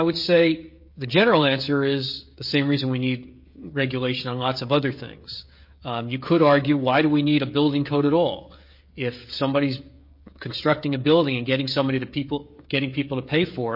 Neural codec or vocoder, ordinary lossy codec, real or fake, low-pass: codec, 16 kHz, 16 kbps, FreqCodec, smaller model; MP3, 32 kbps; fake; 5.4 kHz